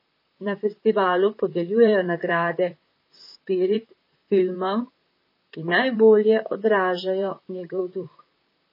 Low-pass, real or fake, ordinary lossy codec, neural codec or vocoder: 5.4 kHz; fake; MP3, 24 kbps; vocoder, 44.1 kHz, 128 mel bands, Pupu-Vocoder